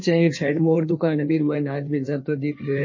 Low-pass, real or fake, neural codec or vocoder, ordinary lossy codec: 7.2 kHz; fake; codec, 16 kHz in and 24 kHz out, 1.1 kbps, FireRedTTS-2 codec; MP3, 32 kbps